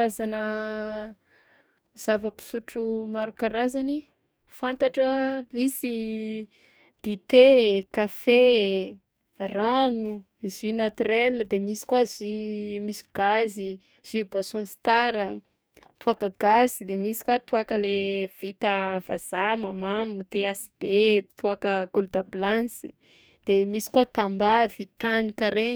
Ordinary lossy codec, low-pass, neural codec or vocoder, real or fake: none; none; codec, 44.1 kHz, 2.6 kbps, DAC; fake